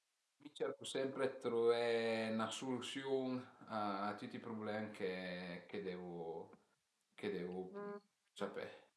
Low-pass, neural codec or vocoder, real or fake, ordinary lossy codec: none; none; real; none